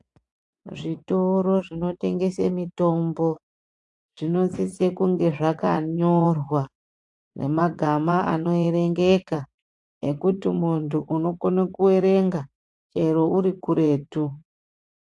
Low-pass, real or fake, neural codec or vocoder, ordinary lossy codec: 10.8 kHz; fake; vocoder, 24 kHz, 100 mel bands, Vocos; AAC, 64 kbps